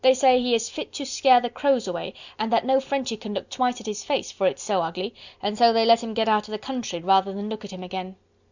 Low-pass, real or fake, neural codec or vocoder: 7.2 kHz; real; none